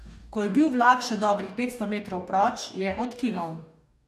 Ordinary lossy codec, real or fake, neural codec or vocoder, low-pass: none; fake; codec, 44.1 kHz, 2.6 kbps, DAC; 14.4 kHz